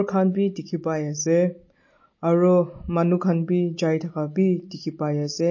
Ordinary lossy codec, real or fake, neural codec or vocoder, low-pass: MP3, 32 kbps; real; none; 7.2 kHz